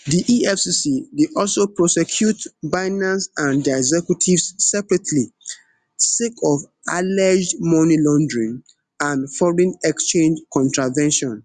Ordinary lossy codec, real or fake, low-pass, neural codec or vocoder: Opus, 64 kbps; real; 10.8 kHz; none